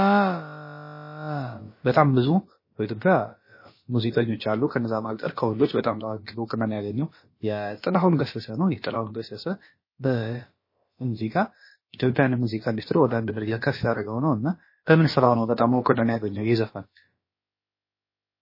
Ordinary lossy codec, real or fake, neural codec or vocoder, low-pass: MP3, 24 kbps; fake; codec, 16 kHz, about 1 kbps, DyCAST, with the encoder's durations; 5.4 kHz